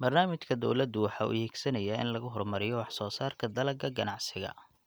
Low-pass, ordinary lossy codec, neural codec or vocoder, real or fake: none; none; none; real